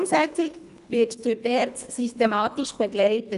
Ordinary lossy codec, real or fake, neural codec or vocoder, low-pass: none; fake; codec, 24 kHz, 1.5 kbps, HILCodec; 10.8 kHz